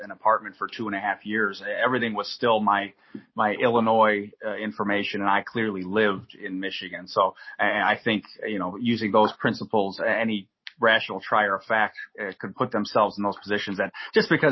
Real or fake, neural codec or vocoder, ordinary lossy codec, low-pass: real; none; MP3, 24 kbps; 7.2 kHz